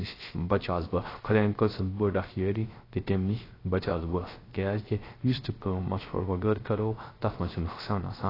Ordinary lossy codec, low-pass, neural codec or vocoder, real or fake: AAC, 24 kbps; 5.4 kHz; codec, 16 kHz, 0.3 kbps, FocalCodec; fake